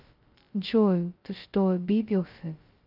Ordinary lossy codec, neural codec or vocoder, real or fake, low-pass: Opus, 24 kbps; codec, 16 kHz, 0.2 kbps, FocalCodec; fake; 5.4 kHz